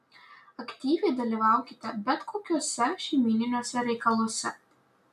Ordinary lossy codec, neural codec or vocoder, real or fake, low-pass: MP3, 96 kbps; none; real; 14.4 kHz